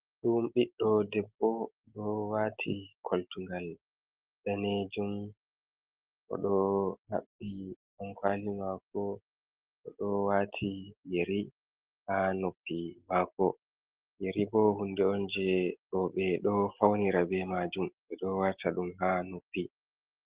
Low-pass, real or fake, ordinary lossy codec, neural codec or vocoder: 3.6 kHz; real; Opus, 16 kbps; none